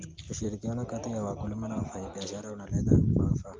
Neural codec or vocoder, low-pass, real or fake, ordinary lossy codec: none; 7.2 kHz; real; Opus, 32 kbps